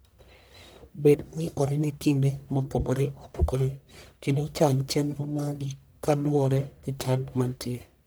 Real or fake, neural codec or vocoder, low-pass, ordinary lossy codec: fake; codec, 44.1 kHz, 1.7 kbps, Pupu-Codec; none; none